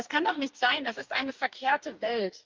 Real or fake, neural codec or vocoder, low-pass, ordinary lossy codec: fake; codec, 44.1 kHz, 2.6 kbps, DAC; 7.2 kHz; Opus, 24 kbps